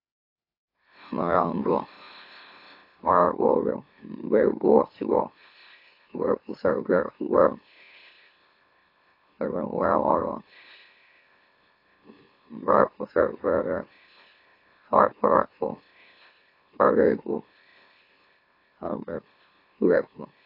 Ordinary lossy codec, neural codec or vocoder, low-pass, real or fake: AAC, 32 kbps; autoencoder, 44.1 kHz, a latent of 192 numbers a frame, MeloTTS; 5.4 kHz; fake